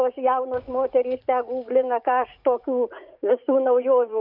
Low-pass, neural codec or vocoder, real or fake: 5.4 kHz; vocoder, 44.1 kHz, 80 mel bands, Vocos; fake